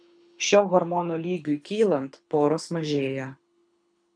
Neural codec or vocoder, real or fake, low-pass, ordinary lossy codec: codec, 24 kHz, 6 kbps, HILCodec; fake; 9.9 kHz; MP3, 96 kbps